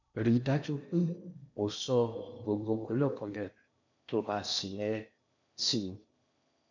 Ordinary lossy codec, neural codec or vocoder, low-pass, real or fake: none; codec, 16 kHz in and 24 kHz out, 0.8 kbps, FocalCodec, streaming, 65536 codes; 7.2 kHz; fake